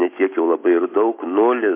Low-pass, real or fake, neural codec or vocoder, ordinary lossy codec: 3.6 kHz; real; none; MP3, 24 kbps